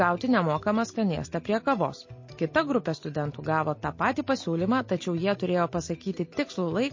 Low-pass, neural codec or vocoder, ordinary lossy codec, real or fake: 7.2 kHz; none; MP3, 32 kbps; real